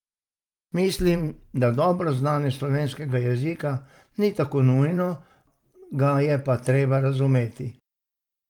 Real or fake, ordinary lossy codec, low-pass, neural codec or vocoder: fake; Opus, 32 kbps; 19.8 kHz; vocoder, 44.1 kHz, 128 mel bands every 512 samples, BigVGAN v2